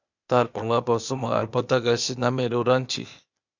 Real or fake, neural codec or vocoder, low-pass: fake; codec, 16 kHz, 0.8 kbps, ZipCodec; 7.2 kHz